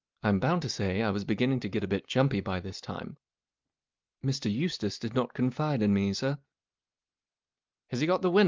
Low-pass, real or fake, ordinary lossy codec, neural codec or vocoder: 7.2 kHz; real; Opus, 24 kbps; none